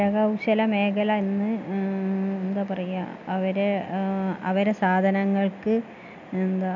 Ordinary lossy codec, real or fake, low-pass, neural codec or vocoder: none; real; 7.2 kHz; none